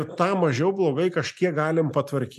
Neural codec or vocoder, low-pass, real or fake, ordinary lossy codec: none; 14.4 kHz; real; MP3, 96 kbps